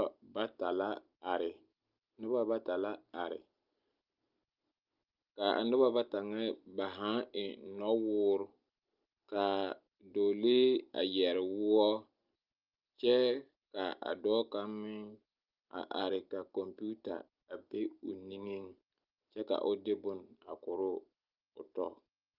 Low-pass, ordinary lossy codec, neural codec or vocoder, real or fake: 5.4 kHz; Opus, 32 kbps; none; real